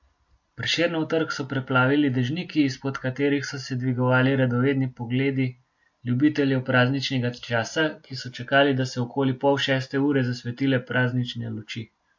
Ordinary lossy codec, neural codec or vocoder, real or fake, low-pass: MP3, 48 kbps; none; real; 7.2 kHz